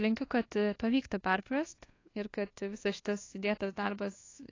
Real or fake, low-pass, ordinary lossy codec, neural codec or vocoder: fake; 7.2 kHz; AAC, 32 kbps; codec, 24 kHz, 1.2 kbps, DualCodec